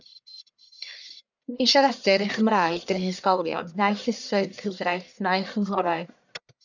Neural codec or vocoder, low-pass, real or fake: codec, 44.1 kHz, 1.7 kbps, Pupu-Codec; 7.2 kHz; fake